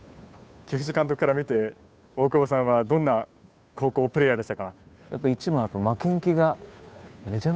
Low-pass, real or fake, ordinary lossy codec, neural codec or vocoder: none; fake; none; codec, 16 kHz, 2 kbps, FunCodec, trained on Chinese and English, 25 frames a second